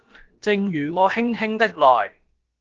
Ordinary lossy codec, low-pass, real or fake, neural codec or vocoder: Opus, 16 kbps; 7.2 kHz; fake; codec, 16 kHz, about 1 kbps, DyCAST, with the encoder's durations